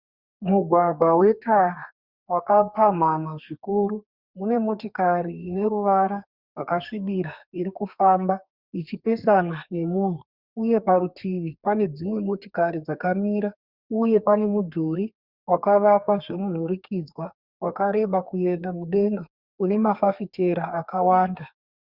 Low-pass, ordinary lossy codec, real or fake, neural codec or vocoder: 5.4 kHz; Opus, 64 kbps; fake; codec, 32 kHz, 1.9 kbps, SNAC